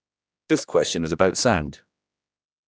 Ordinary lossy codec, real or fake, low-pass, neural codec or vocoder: none; fake; none; codec, 16 kHz, 1 kbps, X-Codec, HuBERT features, trained on general audio